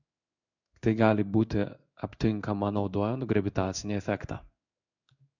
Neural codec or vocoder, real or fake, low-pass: codec, 16 kHz in and 24 kHz out, 1 kbps, XY-Tokenizer; fake; 7.2 kHz